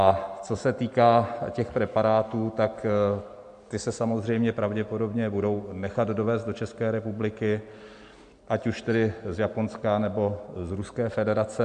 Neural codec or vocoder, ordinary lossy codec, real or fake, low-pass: none; AAC, 64 kbps; real; 9.9 kHz